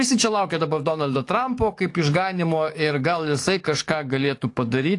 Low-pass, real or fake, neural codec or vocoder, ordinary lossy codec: 10.8 kHz; fake; codec, 44.1 kHz, 7.8 kbps, DAC; AAC, 48 kbps